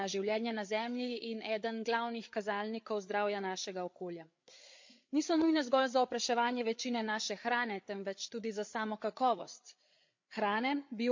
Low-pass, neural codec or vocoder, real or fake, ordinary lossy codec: 7.2 kHz; codec, 16 kHz, 8 kbps, FreqCodec, larger model; fake; MP3, 48 kbps